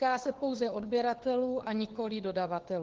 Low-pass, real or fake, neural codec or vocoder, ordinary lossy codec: 7.2 kHz; fake; codec, 16 kHz, 4 kbps, FunCodec, trained on LibriTTS, 50 frames a second; Opus, 16 kbps